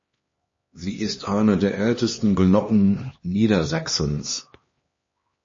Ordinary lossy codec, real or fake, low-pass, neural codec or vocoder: MP3, 32 kbps; fake; 7.2 kHz; codec, 16 kHz, 2 kbps, X-Codec, HuBERT features, trained on LibriSpeech